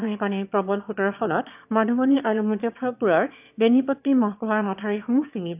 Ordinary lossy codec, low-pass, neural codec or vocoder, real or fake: AAC, 32 kbps; 3.6 kHz; autoencoder, 22.05 kHz, a latent of 192 numbers a frame, VITS, trained on one speaker; fake